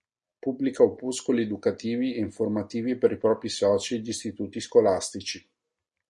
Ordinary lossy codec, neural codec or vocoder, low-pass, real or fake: MP3, 48 kbps; none; 10.8 kHz; real